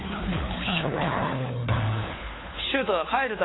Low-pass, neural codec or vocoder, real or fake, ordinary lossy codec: 7.2 kHz; codec, 16 kHz, 4 kbps, FunCodec, trained on LibriTTS, 50 frames a second; fake; AAC, 16 kbps